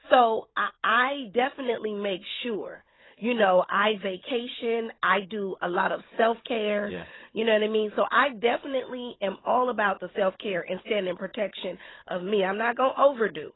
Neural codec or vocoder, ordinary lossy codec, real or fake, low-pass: codec, 16 kHz, 16 kbps, FunCodec, trained on Chinese and English, 50 frames a second; AAC, 16 kbps; fake; 7.2 kHz